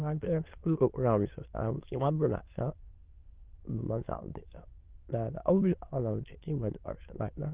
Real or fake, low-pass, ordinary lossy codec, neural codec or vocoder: fake; 3.6 kHz; Opus, 16 kbps; autoencoder, 22.05 kHz, a latent of 192 numbers a frame, VITS, trained on many speakers